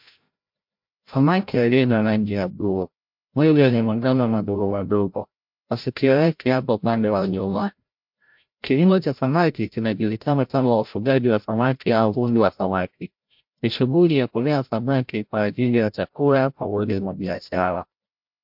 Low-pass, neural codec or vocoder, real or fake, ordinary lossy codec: 5.4 kHz; codec, 16 kHz, 0.5 kbps, FreqCodec, larger model; fake; MP3, 48 kbps